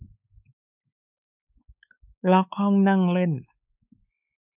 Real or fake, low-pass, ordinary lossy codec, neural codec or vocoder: fake; 3.6 kHz; none; codec, 16 kHz, 4 kbps, X-Codec, WavLM features, trained on Multilingual LibriSpeech